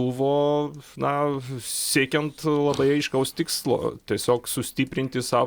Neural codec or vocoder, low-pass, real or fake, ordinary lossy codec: none; 19.8 kHz; real; Opus, 64 kbps